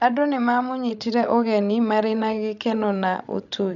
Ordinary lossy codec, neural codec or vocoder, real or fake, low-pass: none; codec, 16 kHz, 16 kbps, FreqCodec, larger model; fake; 7.2 kHz